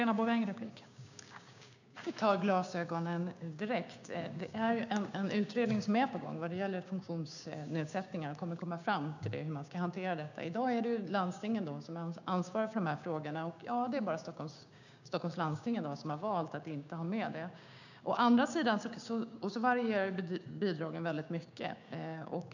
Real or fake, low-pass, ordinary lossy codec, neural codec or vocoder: fake; 7.2 kHz; none; codec, 16 kHz, 6 kbps, DAC